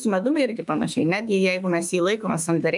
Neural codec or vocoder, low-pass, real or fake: autoencoder, 48 kHz, 32 numbers a frame, DAC-VAE, trained on Japanese speech; 10.8 kHz; fake